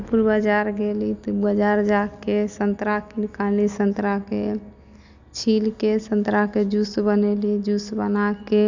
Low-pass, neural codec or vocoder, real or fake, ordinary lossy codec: 7.2 kHz; none; real; none